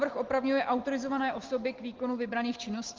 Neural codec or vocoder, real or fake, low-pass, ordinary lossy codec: none; real; 7.2 kHz; Opus, 16 kbps